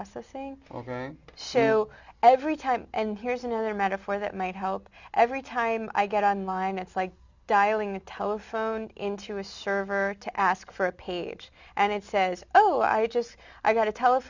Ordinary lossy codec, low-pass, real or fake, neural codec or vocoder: Opus, 64 kbps; 7.2 kHz; real; none